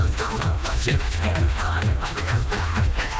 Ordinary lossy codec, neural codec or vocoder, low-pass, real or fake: none; codec, 16 kHz, 1 kbps, FreqCodec, smaller model; none; fake